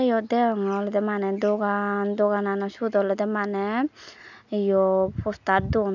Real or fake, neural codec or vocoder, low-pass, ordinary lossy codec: real; none; 7.2 kHz; none